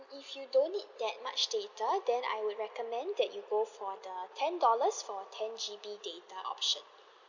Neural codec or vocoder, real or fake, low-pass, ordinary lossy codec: none; real; 7.2 kHz; none